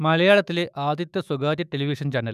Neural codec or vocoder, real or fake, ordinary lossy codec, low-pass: autoencoder, 48 kHz, 128 numbers a frame, DAC-VAE, trained on Japanese speech; fake; none; 14.4 kHz